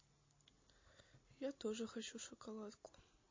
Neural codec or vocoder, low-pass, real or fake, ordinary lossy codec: none; 7.2 kHz; real; MP3, 32 kbps